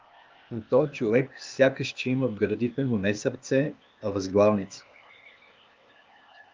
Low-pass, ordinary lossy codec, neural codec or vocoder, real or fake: 7.2 kHz; Opus, 24 kbps; codec, 16 kHz, 0.8 kbps, ZipCodec; fake